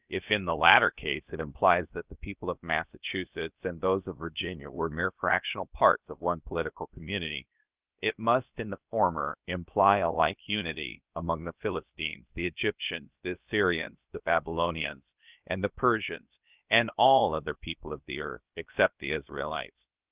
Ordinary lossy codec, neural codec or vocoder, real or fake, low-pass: Opus, 32 kbps; codec, 16 kHz, about 1 kbps, DyCAST, with the encoder's durations; fake; 3.6 kHz